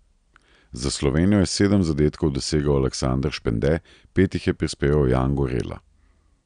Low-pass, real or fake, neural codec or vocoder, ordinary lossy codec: 9.9 kHz; real; none; none